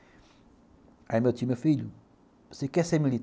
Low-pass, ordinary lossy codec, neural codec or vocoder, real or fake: none; none; none; real